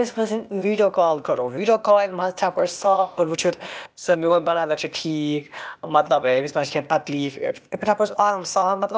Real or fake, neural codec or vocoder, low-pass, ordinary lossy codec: fake; codec, 16 kHz, 0.8 kbps, ZipCodec; none; none